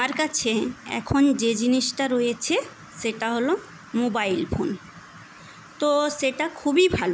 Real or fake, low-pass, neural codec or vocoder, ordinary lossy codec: real; none; none; none